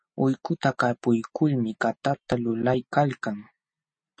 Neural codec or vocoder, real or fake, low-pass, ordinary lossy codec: none; real; 9.9 kHz; MP3, 32 kbps